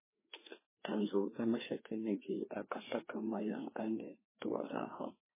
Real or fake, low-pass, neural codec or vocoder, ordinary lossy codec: fake; 3.6 kHz; codec, 16 kHz, 2 kbps, FreqCodec, larger model; MP3, 16 kbps